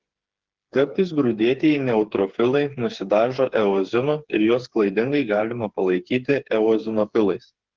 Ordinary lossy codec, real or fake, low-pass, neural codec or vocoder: Opus, 16 kbps; fake; 7.2 kHz; codec, 16 kHz, 4 kbps, FreqCodec, smaller model